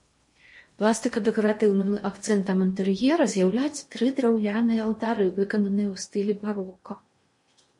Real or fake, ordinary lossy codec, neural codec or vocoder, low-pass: fake; MP3, 48 kbps; codec, 16 kHz in and 24 kHz out, 0.8 kbps, FocalCodec, streaming, 65536 codes; 10.8 kHz